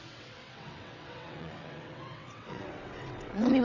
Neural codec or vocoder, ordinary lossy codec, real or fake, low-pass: vocoder, 22.05 kHz, 80 mel bands, WaveNeXt; none; fake; 7.2 kHz